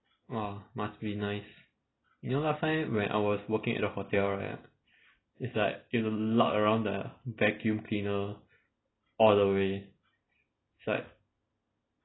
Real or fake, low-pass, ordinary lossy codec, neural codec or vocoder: real; 7.2 kHz; AAC, 16 kbps; none